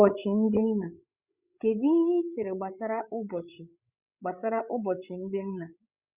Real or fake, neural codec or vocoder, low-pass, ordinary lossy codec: fake; codec, 16 kHz, 8 kbps, FreqCodec, larger model; 3.6 kHz; Opus, 64 kbps